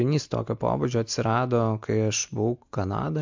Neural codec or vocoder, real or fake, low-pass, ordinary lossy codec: none; real; 7.2 kHz; MP3, 64 kbps